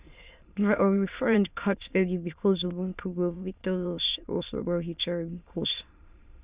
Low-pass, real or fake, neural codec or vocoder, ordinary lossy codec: 3.6 kHz; fake; autoencoder, 22.05 kHz, a latent of 192 numbers a frame, VITS, trained on many speakers; Opus, 64 kbps